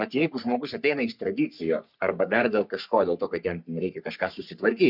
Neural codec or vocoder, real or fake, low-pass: codec, 44.1 kHz, 3.4 kbps, Pupu-Codec; fake; 5.4 kHz